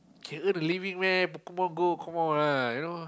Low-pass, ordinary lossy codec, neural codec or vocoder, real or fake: none; none; none; real